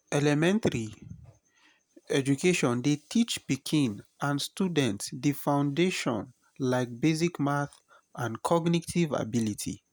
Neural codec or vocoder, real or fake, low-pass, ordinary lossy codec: none; real; none; none